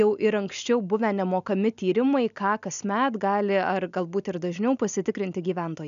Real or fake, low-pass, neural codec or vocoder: real; 7.2 kHz; none